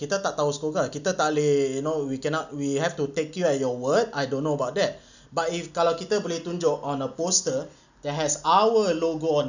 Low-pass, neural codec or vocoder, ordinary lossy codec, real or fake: 7.2 kHz; none; none; real